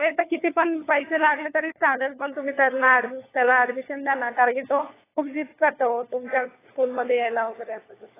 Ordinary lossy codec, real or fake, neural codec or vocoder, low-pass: AAC, 16 kbps; fake; codec, 16 kHz, 4 kbps, FunCodec, trained on LibriTTS, 50 frames a second; 3.6 kHz